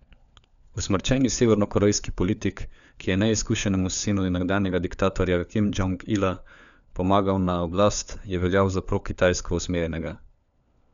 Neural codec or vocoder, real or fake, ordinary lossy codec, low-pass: codec, 16 kHz, 4 kbps, FunCodec, trained on LibriTTS, 50 frames a second; fake; none; 7.2 kHz